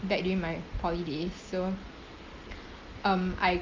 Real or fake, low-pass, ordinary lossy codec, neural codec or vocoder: real; 7.2 kHz; Opus, 24 kbps; none